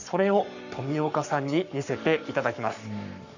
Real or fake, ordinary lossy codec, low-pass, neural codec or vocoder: fake; none; 7.2 kHz; codec, 44.1 kHz, 7.8 kbps, Pupu-Codec